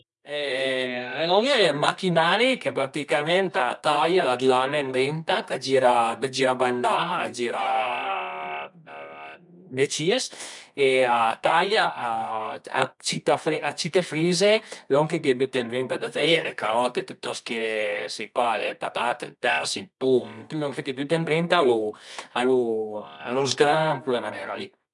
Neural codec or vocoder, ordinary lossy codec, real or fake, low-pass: codec, 24 kHz, 0.9 kbps, WavTokenizer, medium music audio release; none; fake; 10.8 kHz